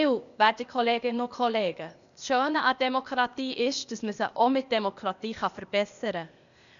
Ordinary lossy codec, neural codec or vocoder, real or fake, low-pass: none; codec, 16 kHz, 0.8 kbps, ZipCodec; fake; 7.2 kHz